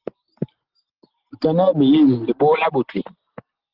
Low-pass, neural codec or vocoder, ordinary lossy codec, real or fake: 5.4 kHz; none; Opus, 16 kbps; real